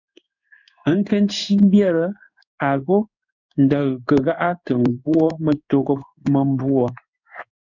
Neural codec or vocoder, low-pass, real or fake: codec, 16 kHz in and 24 kHz out, 1 kbps, XY-Tokenizer; 7.2 kHz; fake